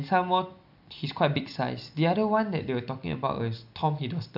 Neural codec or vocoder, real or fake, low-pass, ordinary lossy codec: none; real; 5.4 kHz; none